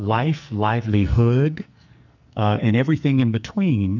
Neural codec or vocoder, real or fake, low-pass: codec, 44.1 kHz, 2.6 kbps, SNAC; fake; 7.2 kHz